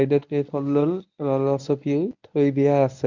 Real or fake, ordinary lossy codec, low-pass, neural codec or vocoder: fake; none; 7.2 kHz; codec, 24 kHz, 0.9 kbps, WavTokenizer, medium speech release version 1